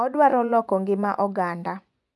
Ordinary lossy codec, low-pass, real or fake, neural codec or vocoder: none; none; fake; vocoder, 24 kHz, 100 mel bands, Vocos